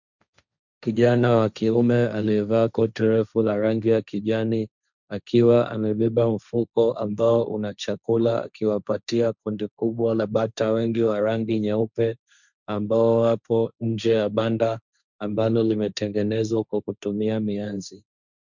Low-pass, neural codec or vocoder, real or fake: 7.2 kHz; codec, 16 kHz, 1.1 kbps, Voila-Tokenizer; fake